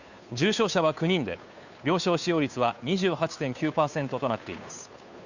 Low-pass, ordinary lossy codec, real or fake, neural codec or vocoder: 7.2 kHz; none; fake; codec, 16 kHz, 2 kbps, FunCodec, trained on Chinese and English, 25 frames a second